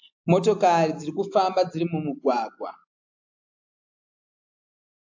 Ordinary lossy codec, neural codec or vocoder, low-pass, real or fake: AAC, 48 kbps; none; 7.2 kHz; real